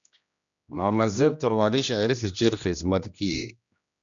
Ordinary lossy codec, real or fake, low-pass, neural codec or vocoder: MP3, 96 kbps; fake; 7.2 kHz; codec, 16 kHz, 1 kbps, X-Codec, HuBERT features, trained on general audio